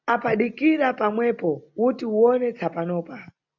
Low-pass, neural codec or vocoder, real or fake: 7.2 kHz; none; real